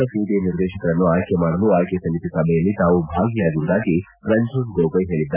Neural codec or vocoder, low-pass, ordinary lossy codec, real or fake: none; 3.6 kHz; none; real